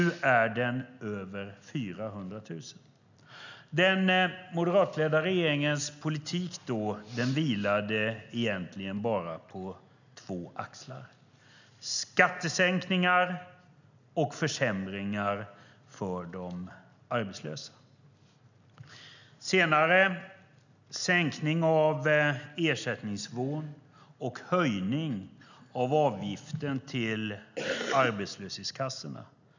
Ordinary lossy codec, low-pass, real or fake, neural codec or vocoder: none; 7.2 kHz; real; none